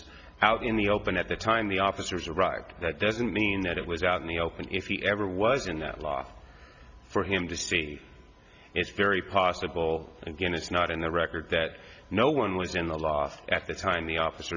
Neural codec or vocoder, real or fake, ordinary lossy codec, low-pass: none; real; Opus, 64 kbps; 7.2 kHz